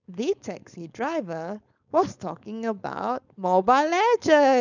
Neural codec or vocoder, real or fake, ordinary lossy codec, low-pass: codec, 16 kHz, 4.8 kbps, FACodec; fake; none; 7.2 kHz